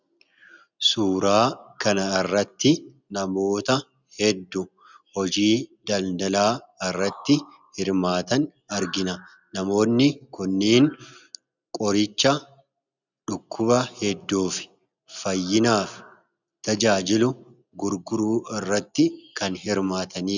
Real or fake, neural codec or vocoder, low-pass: real; none; 7.2 kHz